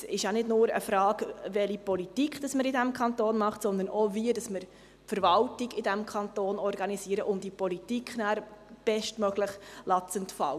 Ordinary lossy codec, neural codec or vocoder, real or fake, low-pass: none; none; real; 14.4 kHz